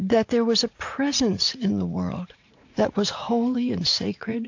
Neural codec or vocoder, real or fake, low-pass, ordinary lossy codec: vocoder, 44.1 kHz, 80 mel bands, Vocos; fake; 7.2 kHz; AAC, 48 kbps